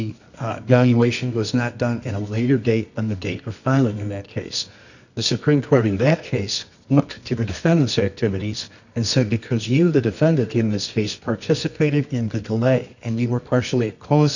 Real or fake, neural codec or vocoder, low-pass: fake; codec, 24 kHz, 0.9 kbps, WavTokenizer, medium music audio release; 7.2 kHz